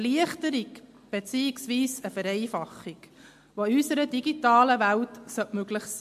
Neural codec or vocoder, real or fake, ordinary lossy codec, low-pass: none; real; MP3, 64 kbps; 14.4 kHz